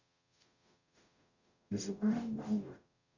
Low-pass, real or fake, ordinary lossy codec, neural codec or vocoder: 7.2 kHz; fake; none; codec, 44.1 kHz, 0.9 kbps, DAC